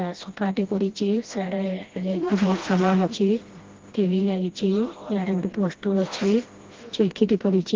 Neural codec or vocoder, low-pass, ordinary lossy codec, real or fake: codec, 16 kHz, 1 kbps, FreqCodec, smaller model; 7.2 kHz; Opus, 16 kbps; fake